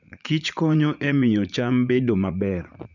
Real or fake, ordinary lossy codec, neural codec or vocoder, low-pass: fake; none; vocoder, 44.1 kHz, 80 mel bands, Vocos; 7.2 kHz